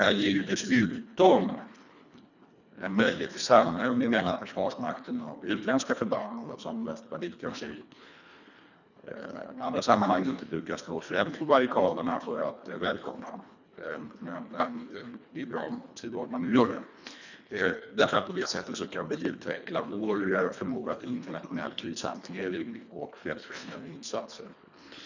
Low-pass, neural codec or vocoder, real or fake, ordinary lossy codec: 7.2 kHz; codec, 24 kHz, 1.5 kbps, HILCodec; fake; none